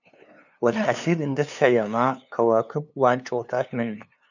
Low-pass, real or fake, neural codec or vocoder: 7.2 kHz; fake; codec, 16 kHz, 2 kbps, FunCodec, trained on LibriTTS, 25 frames a second